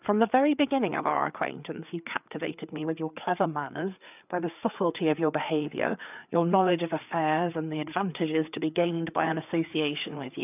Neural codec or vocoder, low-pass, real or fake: codec, 16 kHz, 4 kbps, FreqCodec, larger model; 3.6 kHz; fake